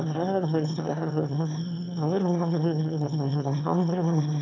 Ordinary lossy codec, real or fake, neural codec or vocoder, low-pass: none; fake; autoencoder, 22.05 kHz, a latent of 192 numbers a frame, VITS, trained on one speaker; 7.2 kHz